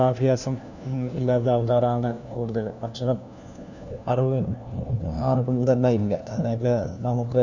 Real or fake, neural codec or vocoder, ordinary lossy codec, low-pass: fake; codec, 16 kHz, 1 kbps, FunCodec, trained on LibriTTS, 50 frames a second; none; 7.2 kHz